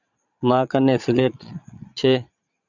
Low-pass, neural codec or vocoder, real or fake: 7.2 kHz; vocoder, 22.05 kHz, 80 mel bands, Vocos; fake